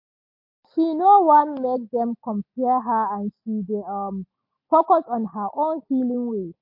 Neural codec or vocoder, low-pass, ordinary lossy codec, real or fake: none; 5.4 kHz; MP3, 32 kbps; real